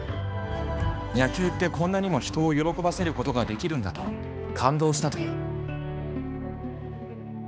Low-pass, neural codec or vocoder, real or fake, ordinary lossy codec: none; codec, 16 kHz, 2 kbps, X-Codec, HuBERT features, trained on balanced general audio; fake; none